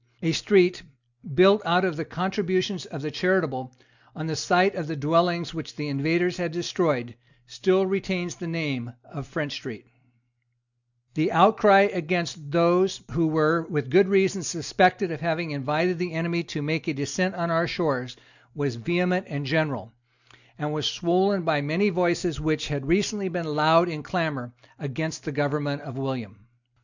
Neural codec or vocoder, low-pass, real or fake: none; 7.2 kHz; real